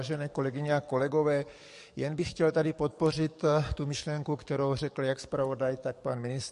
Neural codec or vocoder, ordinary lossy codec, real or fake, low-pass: codec, 44.1 kHz, 7.8 kbps, DAC; MP3, 48 kbps; fake; 14.4 kHz